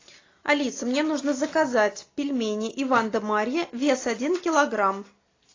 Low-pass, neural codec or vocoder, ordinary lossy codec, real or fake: 7.2 kHz; none; AAC, 32 kbps; real